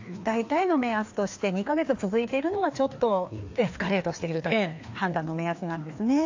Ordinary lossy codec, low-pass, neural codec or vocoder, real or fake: AAC, 48 kbps; 7.2 kHz; codec, 16 kHz, 2 kbps, FreqCodec, larger model; fake